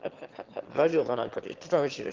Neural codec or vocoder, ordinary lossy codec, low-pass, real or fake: autoencoder, 22.05 kHz, a latent of 192 numbers a frame, VITS, trained on one speaker; Opus, 16 kbps; 7.2 kHz; fake